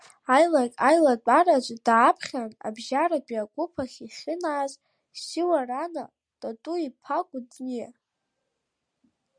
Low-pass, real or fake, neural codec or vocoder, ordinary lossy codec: 9.9 kHz; real; none; Opus, 64 kbps